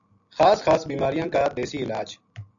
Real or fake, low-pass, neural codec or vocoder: real; 7.2 kHz; none